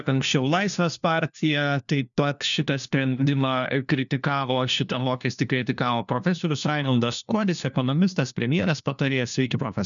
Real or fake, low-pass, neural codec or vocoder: fake; 7.2 kHz; codec, 16 kHz, 1 kbps, FunCodec, trained on LibriTTS, 50 frames a second